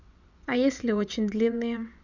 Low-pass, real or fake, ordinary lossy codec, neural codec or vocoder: 7.2 kHz; fake; none; vocoder, 22.05 kHz, 80 mel bands, WaveNeXt